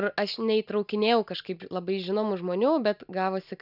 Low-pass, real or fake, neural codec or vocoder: 5.4 kHz; real; none